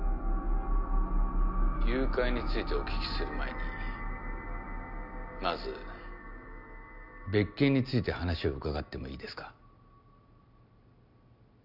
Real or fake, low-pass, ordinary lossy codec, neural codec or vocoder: real; 5.4 kHz; none; none